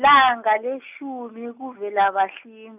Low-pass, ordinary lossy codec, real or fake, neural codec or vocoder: 3.6 kHz; none; real; none